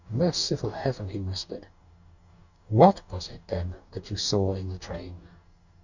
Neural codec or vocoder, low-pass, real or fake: codec, 44.1 kHz, 2.6 kbps, DAC; 7.2 kHz; fake